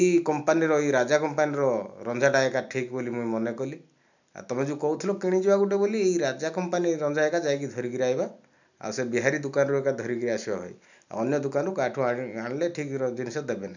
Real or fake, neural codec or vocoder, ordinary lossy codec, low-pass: real; none; none; 7.2 kHz